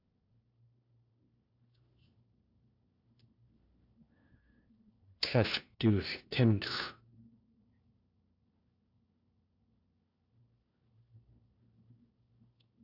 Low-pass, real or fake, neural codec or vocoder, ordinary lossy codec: 5.4 kHz; fake; codec, 16 kHz, 1 kbps, FunCodec, trained on LibriTTS, 50 frames a second; AAC, 48 kbps